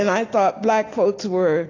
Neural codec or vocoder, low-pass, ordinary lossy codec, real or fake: none; 7.2 kHz; AAC, 32 kbps; real